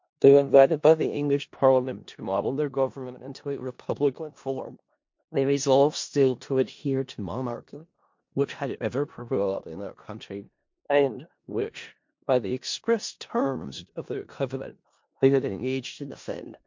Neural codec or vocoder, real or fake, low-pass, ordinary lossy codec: codec, 16 kHz in and 24 kHz out, 0.4 kbps, LongCat-Audio-Codec, four codebook decoder; fake; 7.2 kHz; MP3, 48 kbps